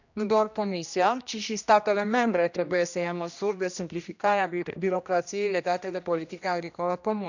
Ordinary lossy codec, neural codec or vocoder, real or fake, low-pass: none; codec, 16 kHz, 1 kbps, X-Codec, HuBERT features, trained on general audio; fake; 7.2 kHz